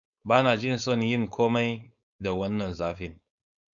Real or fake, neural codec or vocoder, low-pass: fake; codec, 16 kHz, 4.8 kbps, FACodec; 7.2 kHz